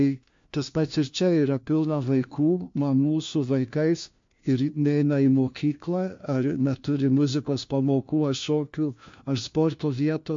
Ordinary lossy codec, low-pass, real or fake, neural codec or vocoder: MP3, 48 kbps; 7.2 kHz; fake; codec, 16 kHz, 1 kbps, FunCodec, trained on LibriTTS, 50 frames a second